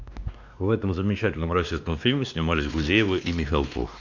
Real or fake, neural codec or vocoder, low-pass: fake; codec, 16 kHz, 2 kbps, X-Codec, WavLM features, trained on Multilingual LibriSpeech; 7.2 kHz